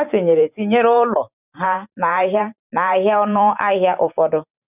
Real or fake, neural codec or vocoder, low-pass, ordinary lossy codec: fake; vocoder, 44.1 kHz, 128 mel bands every 512 samples, BigVGAN v2; 3.6 kHz; none